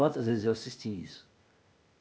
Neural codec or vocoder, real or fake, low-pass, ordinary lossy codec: codec, 16 kHz, 0.8 kbps, ZipCodec; fake; none; none